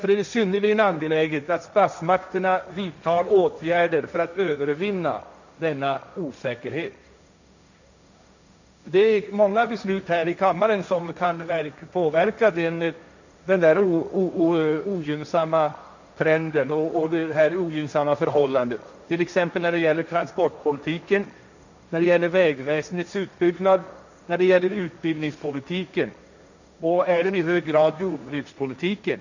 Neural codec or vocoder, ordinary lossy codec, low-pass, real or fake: codec, 16 kHz, 1.1 kbps, Voila-Tokenizer; none; 7.2 kHz; fake